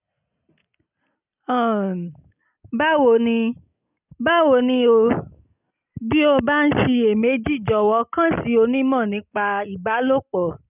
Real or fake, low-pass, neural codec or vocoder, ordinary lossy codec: real; 3.6 kHz; none; none